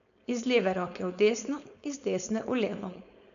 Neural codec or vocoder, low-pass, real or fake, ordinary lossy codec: codec, 16 kHz, 4.8 kbps, FACodec; 7.2 kHz; fake; none